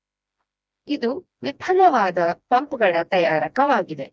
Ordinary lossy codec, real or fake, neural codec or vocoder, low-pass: none; fake; codec, 16 kHz, 1 kbps, FreqCodec, smaller model; none